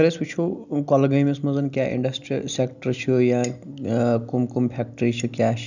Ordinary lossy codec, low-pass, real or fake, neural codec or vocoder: none; 7.2 kHz; real; none